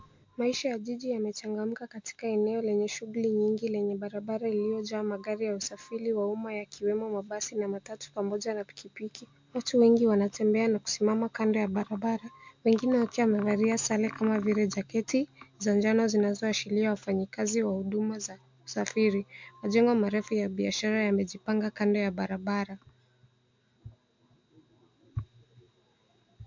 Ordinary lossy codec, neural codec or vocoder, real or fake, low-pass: MP3, 64 kbps; none; real; 7.2 kHz